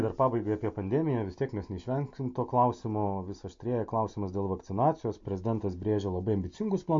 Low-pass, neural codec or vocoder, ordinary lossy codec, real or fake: 7.2 kHz; none; MP3, 48 kbps; real